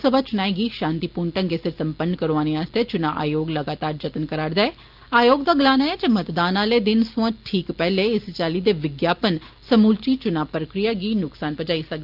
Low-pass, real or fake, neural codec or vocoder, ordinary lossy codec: 5.4 kHz; real; none; Opus, 32 kbps